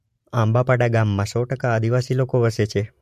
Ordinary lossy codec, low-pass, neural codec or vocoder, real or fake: MP3, 64 kbps; 14.4 kHz; none; real